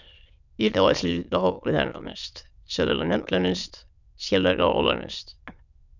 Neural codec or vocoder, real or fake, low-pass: autoencoder, 22.05 kHz, a latent of 192 numbers a frame, VITS, trained on many speakers; fake; 7.2 kHz